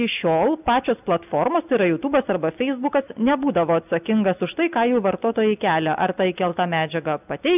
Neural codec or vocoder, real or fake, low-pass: none; real; 3.6 kHz